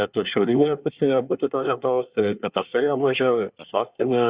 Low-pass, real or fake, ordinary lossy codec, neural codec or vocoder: 3.6 kHz; fake; Opus, 64 kbps; codec, 24 kHz, 1 kbps, SNAC